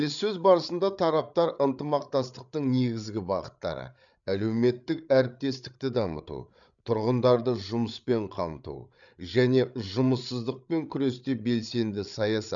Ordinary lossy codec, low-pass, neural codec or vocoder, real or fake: none; 7.2 kHz; codec, 16 kHz, 8 kbps, FreqCodec, larger model; fake